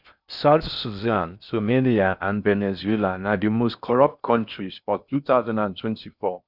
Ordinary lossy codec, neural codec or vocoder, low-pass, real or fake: none; codec, 16 kHz in and 24 kHz out, 0.6 kbps, FocalCodec, streaming, 4096 codes; 5.4 kHz; fake